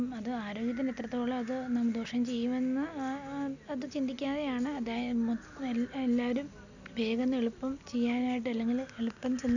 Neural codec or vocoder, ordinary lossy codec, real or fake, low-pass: none; none; real; 7.2 kHz